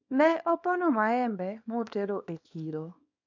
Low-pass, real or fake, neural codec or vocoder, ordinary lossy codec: 7.2 kHz; fake; codec, 24 kHz, 0.9 kbps, WavTokenizer, small release; MP3, 64 kbps